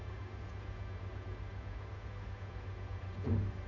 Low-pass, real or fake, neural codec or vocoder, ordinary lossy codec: 7.2 kHz; fake; codec, 16 kHz, 8 kbps, FunCodec, trained on Chinese and English, 25 frames a second; none